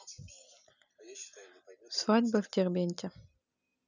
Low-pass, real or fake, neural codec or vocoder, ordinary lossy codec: 7.2 kHz; real; none; none